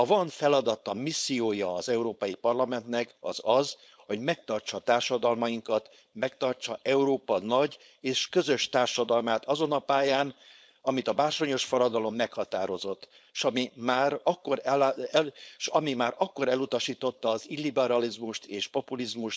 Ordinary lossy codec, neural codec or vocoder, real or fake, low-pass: none; codec, 16 kHz, 4.8 kbps, FACodec; fake; none